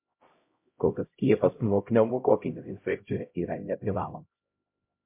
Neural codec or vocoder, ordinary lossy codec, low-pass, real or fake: codec, 16 kHz, 0.5 kbps, X-Codec, HuBERT features, trained on LibriSpeech; MP3, 32 kbps; 3.6 kHz; fake